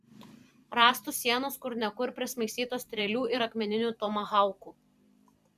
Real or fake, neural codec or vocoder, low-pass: fake; vocoder, 44.1 kHz, 128 mel bands every 512 samples, BigVGAN v2; 14.4 kHz